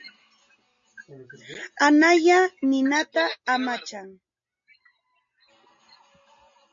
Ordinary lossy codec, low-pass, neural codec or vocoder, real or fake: MP3, 48 kbps; 7.2 kHz; none; real